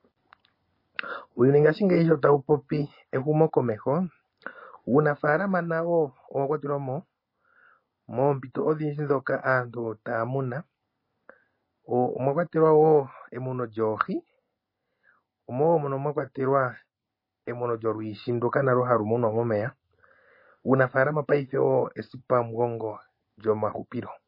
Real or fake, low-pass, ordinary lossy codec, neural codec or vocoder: real; 5.4 kHz; MP3, 24 kbps; none